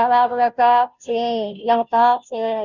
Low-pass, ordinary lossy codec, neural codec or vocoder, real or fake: 7.2 kHz; none; codec, 16 kHz, 0.5 kbps, FunCodec, trained on Chinese and English, 25 frames a second; fake